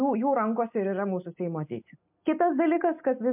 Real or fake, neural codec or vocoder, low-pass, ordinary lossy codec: real; none; 3.6 kHz; MP3, 32 kbps